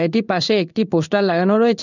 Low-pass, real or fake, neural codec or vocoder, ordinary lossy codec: 7.2 kHz; fake; codec, 16 kHz in and 24 kHz out, 1 kbps, XY-Tokenizer; none